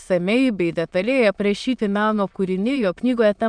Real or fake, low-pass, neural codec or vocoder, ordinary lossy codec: fake; 9.9 kHz; autoencoder, 22.05 kHz, a latent of 192 numbers a frame, VITS, trained on many speakers; MP3, 96 kbps